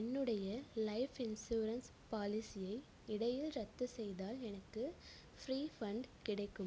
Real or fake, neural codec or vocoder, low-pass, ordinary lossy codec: real; none; none; none